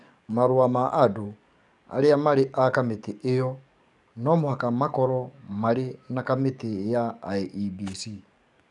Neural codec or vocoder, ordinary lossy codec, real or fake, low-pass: codec, 44.1 kHz, 7.8 kbps, DAC; none; fake; 10.8 kHz